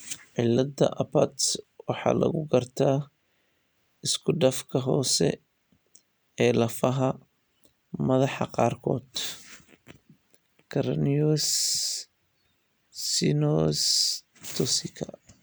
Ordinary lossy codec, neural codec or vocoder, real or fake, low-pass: none; none; real; none